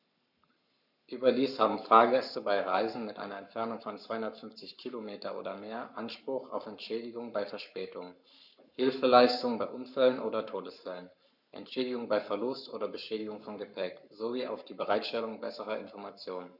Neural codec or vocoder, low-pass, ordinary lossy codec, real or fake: codec, 44.1 kHz, 7.8 kbps, Pupu-Codec; 5.4 kHz; none; fake